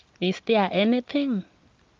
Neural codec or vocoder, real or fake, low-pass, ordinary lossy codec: none; real; 7.2 kHz; Opus, 32 kbps